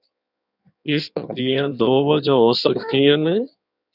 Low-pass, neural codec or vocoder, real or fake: 5.4 kHz; codec, 16 kHz in and 24 kHz out, 1.1 kbps, FireRedTTS-2 codec; fake